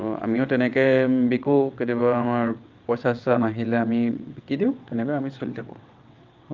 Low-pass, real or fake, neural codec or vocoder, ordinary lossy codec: 7.2 kHz; fake; vocoder, 22.05 kHz, 80 mel bands, WaveNeXt; Opus, 32 kbps